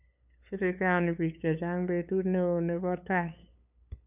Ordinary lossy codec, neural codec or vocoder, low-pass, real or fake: none; codec, 16 kHz, 8 kbps, FunCodec, trained on LibriTTS, 25 frames a second; 3.6 kHz; fake